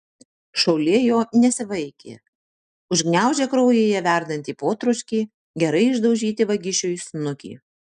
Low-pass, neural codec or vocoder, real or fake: 9.9 kHz; none; real